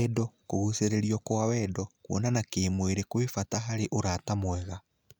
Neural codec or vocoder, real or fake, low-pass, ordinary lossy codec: none; real; none; none